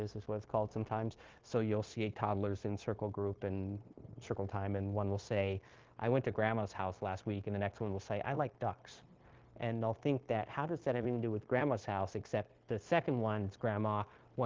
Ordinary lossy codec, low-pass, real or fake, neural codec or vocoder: Opus, 16 kbps; 7.2 kHz; fake; codec, 16 kHz, 0.9 kbps, LongCat-Audio-Codec